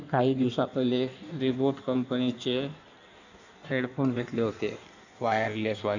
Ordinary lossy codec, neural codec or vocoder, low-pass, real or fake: none; codec, 16 kHz in and 24 kHz out, 1.1 kbps, FireRedTTS-2 codec; 7.2 kHz; fake